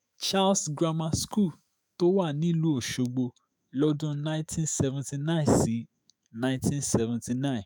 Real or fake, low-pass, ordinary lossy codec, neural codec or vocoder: fake; none; none; autoencoder, 48 kHz, 128 numbers a frame, DAC-VAE, trained on Japanese speech